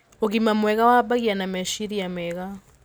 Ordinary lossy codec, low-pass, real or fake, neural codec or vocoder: none; none; real; none